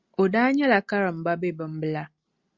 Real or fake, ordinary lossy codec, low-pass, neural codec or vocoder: real; Opus, 64 kbps; 7.2 kHz; none